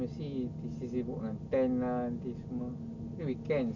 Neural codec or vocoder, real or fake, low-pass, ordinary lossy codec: none; real; 7.2 kHz; none